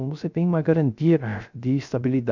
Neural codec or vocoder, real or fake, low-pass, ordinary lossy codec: codec, 16 kHz, 0.3 kbps, FocalCodec; fake; 7.2 kHz; none